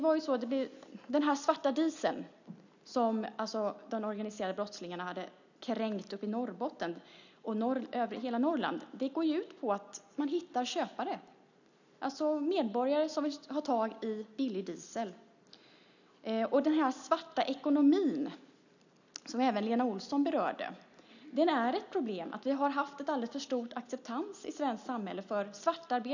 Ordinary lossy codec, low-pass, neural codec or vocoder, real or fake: none; 7.2 kHz; none; real